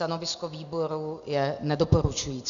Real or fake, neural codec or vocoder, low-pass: real; none; 7.2 kHz